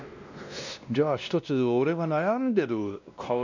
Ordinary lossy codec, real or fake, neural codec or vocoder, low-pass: none; fake; codec, 16 kHz, 1 kbps, X-Codec, WavLM features, trained on Multilingual LibriSpeech; 7.2 kHz